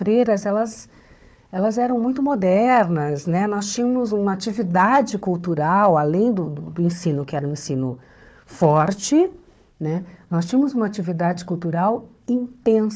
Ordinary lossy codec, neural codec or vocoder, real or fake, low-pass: none; codec, 16 kHz, 4 kbps, FunCodec, trained on Chinese and English, 50 frames a second; fake; none